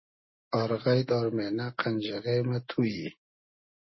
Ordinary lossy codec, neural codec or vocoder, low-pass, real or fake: MP3, 24 kbps; none; 7.2 kHz; real